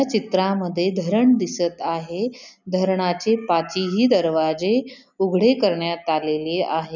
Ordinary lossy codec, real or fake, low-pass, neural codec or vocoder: none; real; 7.2 kHz; none